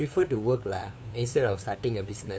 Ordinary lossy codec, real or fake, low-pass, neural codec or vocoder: none; fake; none; codec, 16 kHz, 2 kbps, FunCodec, trained on LibriTTS, 25 frames a second